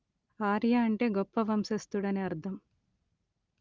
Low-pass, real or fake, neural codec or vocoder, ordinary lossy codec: 7.2 kHz; real; none; Opus, 32 kbps